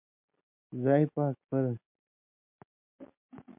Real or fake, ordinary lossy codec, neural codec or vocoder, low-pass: real; MP3, 32 kbps; none; 3.6 kHz